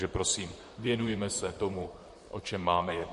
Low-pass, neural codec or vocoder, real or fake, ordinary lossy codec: 14.4 kHz; vocoder, 44.1 kHz, 128 mel bands, Pupu-Vocoder; fake; MP3, 48 kbps